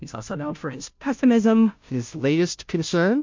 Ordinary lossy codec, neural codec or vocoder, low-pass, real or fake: MP3, 48 kbps; codec, 16 kHz, 0.5 kbps, FunCodec, trained on Chinese and English, 25 frames a second; 7.2 kHz; fake